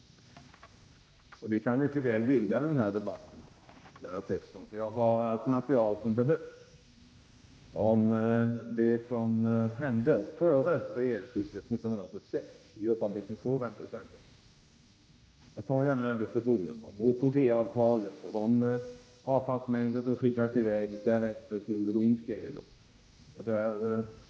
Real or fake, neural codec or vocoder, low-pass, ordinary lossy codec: fake; codec, 16 kHz, 1 kbps, X-Codec, HuBERT features, trained on general audio; none; none